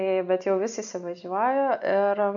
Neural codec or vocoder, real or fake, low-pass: none; real; 7.2 kHz